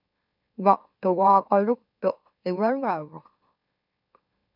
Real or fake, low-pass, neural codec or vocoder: fake; 5.4 kHz; autoencoder, 44.1 kHz, a latent of 192 numbers a frame, MeloTTS